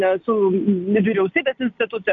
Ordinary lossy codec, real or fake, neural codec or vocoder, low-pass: MP3, 96 kbps; real; none; 7.2 kHz